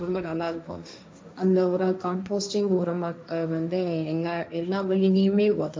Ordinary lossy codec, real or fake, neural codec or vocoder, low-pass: none; fake; codec, 16 kHz, 1.1 kbps, Voila-Tokenizer; none